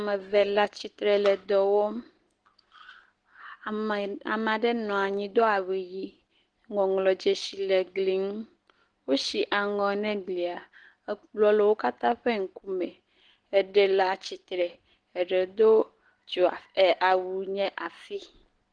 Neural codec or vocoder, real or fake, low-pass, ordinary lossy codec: none; real; 9.9 kHz; Opus, 16 kbps